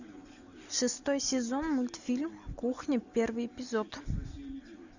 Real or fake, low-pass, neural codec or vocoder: real; 7.2 kHz; none